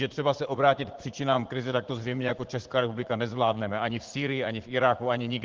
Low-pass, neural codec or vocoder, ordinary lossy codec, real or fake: 7.2 kHz; vocoder, 44.1 kHz, 80 mel bands, Vocos; Opus, 16 kbps; fake